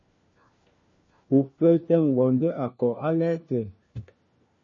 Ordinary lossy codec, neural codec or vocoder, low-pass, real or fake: MP3, 32 kbps; codec, 16 kHz, 1 kbps, FunCodec, trained on LibriTTS, 50 frames a second; 7.2 kHz; fake